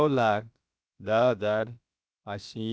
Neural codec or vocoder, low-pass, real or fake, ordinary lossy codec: codec, 16 kHz, about 1 kbps, DyCAST, with the encoder's durations; none; fake; none